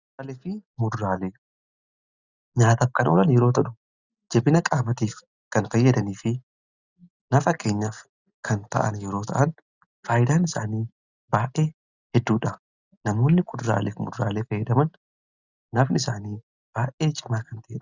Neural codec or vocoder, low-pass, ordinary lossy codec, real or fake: none; 7.2 kHz; Opus, 64 kbps; real